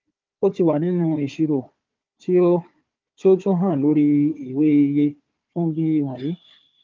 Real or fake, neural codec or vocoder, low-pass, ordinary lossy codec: fake; codec, 16 kHz, 4 kbps, FunCodec, trained on Chinese and English, 50 frames a second; 7.2 kHz; Opus, 32 kbps